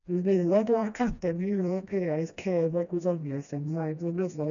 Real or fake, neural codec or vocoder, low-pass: fake; codec, 16 kHz, 1 kbps, FreqCodec, smaller model; 7.2 kHz